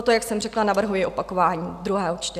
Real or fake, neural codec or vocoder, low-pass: real; none; 14.4 kHz